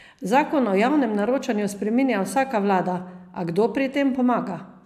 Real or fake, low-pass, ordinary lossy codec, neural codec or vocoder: real; 14.4 kHz; AAC, 96 kbps; none